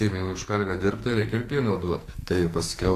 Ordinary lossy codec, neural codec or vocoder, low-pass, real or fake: AAC, 64 kbps; codec, 44.1 kHz, 2.6 kbps, SNAC; 14.4 kHz; fake